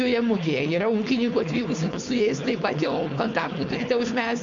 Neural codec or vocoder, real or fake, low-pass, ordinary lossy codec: codec, 16 kHz, 4.8 kbps, FACodec; fake; 7.2 kHz; MP3, 48 kbps